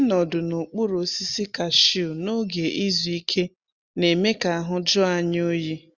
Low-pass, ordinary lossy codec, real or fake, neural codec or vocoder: 7.2 kHz; Opus, 64 kbps; real; none